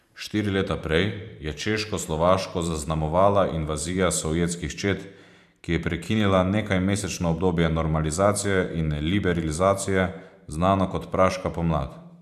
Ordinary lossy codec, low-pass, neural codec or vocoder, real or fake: none; 14.4 kHz; vocoder, 44.1 kHz, 128 mel bands every 512 samples, BigVGAN v2; fake